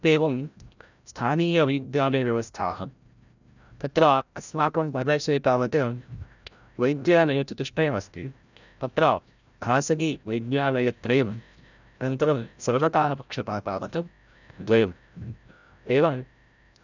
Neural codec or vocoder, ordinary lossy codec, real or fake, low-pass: codec, 16 kHz, 0.5 kbps, FreqCodec, larger model; none; fake; 7.2 kHz